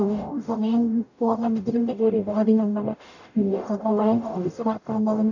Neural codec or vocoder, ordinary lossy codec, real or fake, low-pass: codec, 44.1 kHz, 0.9 kbps, DAC; none; fake; 7.2 kHz